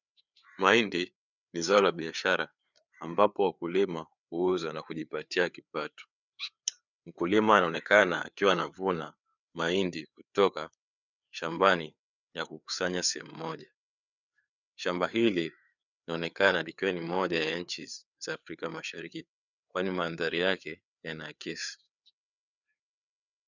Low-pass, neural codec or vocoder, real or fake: 7.2 kHz; codec, 16 kHz, 4 kbps, FreqCodec, larger model; fake